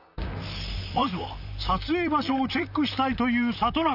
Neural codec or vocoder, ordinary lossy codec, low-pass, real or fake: vocoder, 44.1 kHz, 128 mel bands, Pupu-Vocoder; none; 5.4 kHz; fake